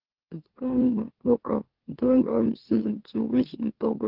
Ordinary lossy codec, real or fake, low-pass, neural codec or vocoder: Opus, 16 kbps; fake; 5.4 kHz; autoencoder, 44.1 kHz, a latent of 192 numbers a frame, MeloTTS